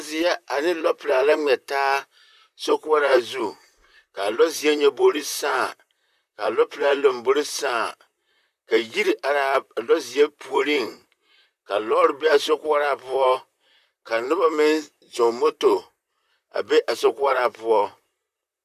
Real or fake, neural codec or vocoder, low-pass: fake; vocoder, 44.1 kHz, 128 mel bands, Pupu-Vocoder; 14.4 kHz